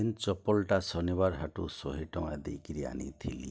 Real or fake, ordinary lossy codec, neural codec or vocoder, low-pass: real; none; none; none